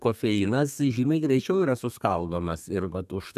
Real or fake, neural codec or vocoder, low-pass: fake; codec, 32 kHz, 1.9 kbps, SNAC; 14.4 kHz